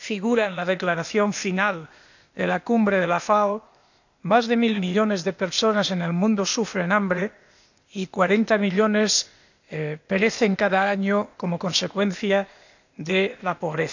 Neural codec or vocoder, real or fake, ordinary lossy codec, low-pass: codec, 16 kHz, 0.8 kbps, ZipCodec; fake; none; 7.2 kHz